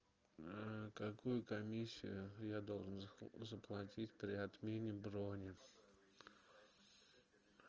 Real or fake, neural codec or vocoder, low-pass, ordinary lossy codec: real; none; 7.2 kHz; Opus, 24 kbps